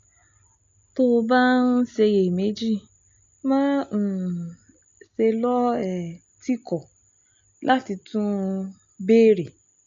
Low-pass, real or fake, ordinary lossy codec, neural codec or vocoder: 7.2 kHz; real; MP3, 48 kbps; none